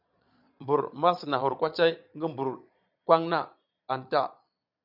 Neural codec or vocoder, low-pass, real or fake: vocoder, 22.05 kHz, 80 mel bands, Vocos; 5.4 kHz; fake